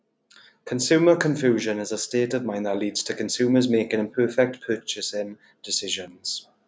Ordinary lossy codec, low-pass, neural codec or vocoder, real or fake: none; none; none; real